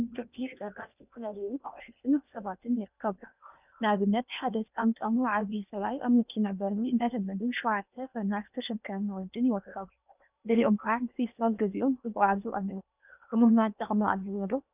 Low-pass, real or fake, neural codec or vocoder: 3.6 kHz; fake; codec, 16 kHz in and 24 kHz out, 0.8 kbps, FocalCodec, streaming, 65536 codes